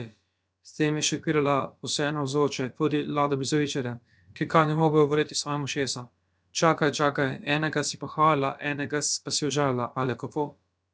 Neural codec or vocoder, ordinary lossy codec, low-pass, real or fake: codec, 16 kHz, about 1 kbps, DyCAST, with the encoder's durations; none; none; fake